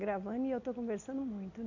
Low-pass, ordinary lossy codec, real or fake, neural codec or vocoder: 7.2 kHz; none; real; none